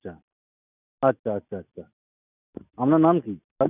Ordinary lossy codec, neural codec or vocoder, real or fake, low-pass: none; none; real; 3.6 kHz